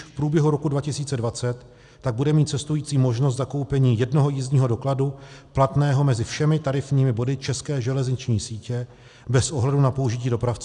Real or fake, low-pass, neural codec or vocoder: real; 10.8 kHz; none